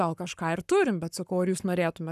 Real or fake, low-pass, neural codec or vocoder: fake; 14.4 kHz; codec, 44.1 kHz, 7.8 kbps, Pupu-Codec